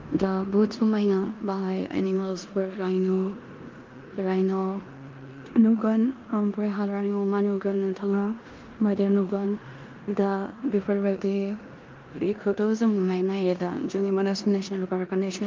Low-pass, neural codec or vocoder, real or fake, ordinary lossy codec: 7.2 kHz; codec, 16 kHz in and 24 kHz out, 0.9 kbps, LongCat-Audio-Codec, four codebook decoder; fake; Opus, 24 kbps